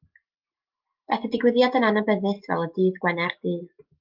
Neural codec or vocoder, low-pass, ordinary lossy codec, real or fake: none; 5.4 kHz; Opus, 24 kbps; real